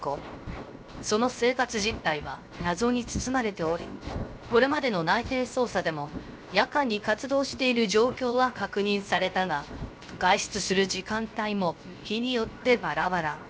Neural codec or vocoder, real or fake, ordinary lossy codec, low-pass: codec, 16 kHz, 0.7 kbps, FocalCodec; fake; none; none